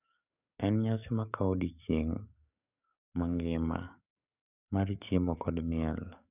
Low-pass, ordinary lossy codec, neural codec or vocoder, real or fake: 3.6 kHz; none; codec, 44.1 kHz, 7.8 kbps, DAC; fake